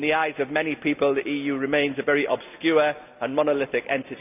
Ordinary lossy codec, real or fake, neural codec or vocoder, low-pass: none; real; none; 3.6 kHz